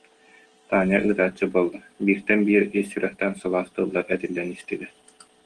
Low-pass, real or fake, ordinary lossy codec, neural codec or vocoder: 9.9 kHz; real; Opus, 16 kbps; none